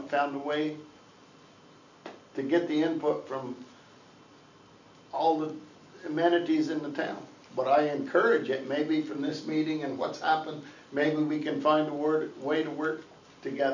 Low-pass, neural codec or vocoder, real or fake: 7.2 kHz; none; real